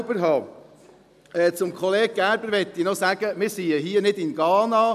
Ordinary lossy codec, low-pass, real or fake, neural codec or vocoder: none; 14.4 kHz; real; none